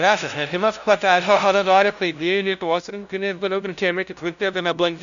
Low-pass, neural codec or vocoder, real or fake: 7.2 kHz; codec, 16 kHz, 0.5 kbps, FunCodec, trained on LibriTTS, 25 frames a second; fake